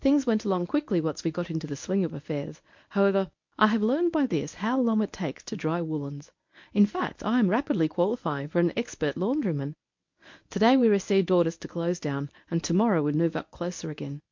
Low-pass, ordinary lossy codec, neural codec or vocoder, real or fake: 7.2 kHz; MP3, 48 kbps; codec, 24 kHz, 0.9 kbps, WavTokenizer, medium speech release version 1; fake